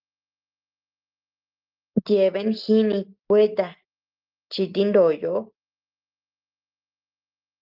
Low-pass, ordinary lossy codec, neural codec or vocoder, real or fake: 5.4 kHz; Opus, 24 kbps; none; real